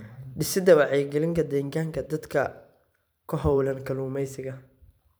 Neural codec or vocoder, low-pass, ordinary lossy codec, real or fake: vocoder, 44.1 kHz, 128 mel bands every 512 samples, BigVGAN v2; none; none; fake